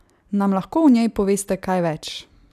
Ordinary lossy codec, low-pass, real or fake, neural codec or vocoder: none; 14.4 kHz; real; none